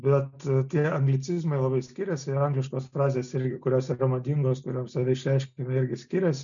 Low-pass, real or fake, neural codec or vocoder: 7.2 kHz; real; none